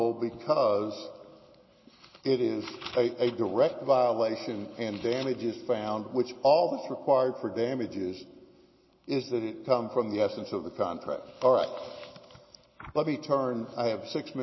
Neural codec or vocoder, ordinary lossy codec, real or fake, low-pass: none; MP3, 24 kbps; real; 7.2 kHz